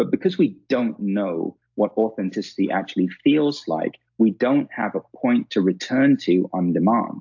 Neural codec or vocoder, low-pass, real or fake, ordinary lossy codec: none; 7.2 kHz; real; AAC, 48 kbps